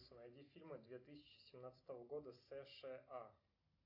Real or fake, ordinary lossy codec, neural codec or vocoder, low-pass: real; AAC, 48 kbps; none; 5.4 kHz